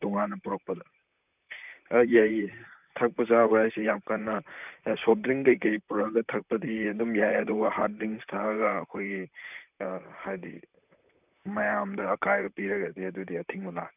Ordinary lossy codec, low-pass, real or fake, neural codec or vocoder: none; 3.6 kHz; fake; vocoder, 44.1 kHz, 128 mel bands, Pupu-Vocoder